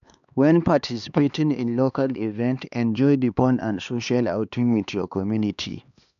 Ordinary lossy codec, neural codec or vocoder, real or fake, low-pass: none; codec, 16 kHz, 2 kbps, X-Codec, HuBERT features, trained on LibriSpeech; fake; 7.2 kHz